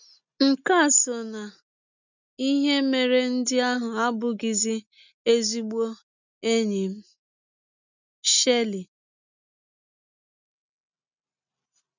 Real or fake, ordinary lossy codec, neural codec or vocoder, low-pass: real; none; none; 7.2 kHz